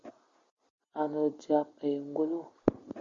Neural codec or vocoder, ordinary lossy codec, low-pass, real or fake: none; Opus, 64 kbps; 7.2 kHz; real